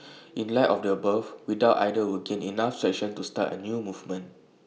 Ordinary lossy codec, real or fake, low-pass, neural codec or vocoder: none; real; none; none